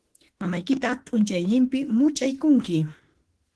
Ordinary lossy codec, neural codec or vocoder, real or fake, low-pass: Opus, 16 kbps; autoencoder, 48 kHz, 32 numbers a frame, DAC-VAE, trained on Japanese speech; fake; 10.8 kHz